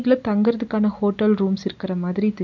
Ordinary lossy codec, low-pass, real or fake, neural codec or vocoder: none; 7.2 kHz; real; none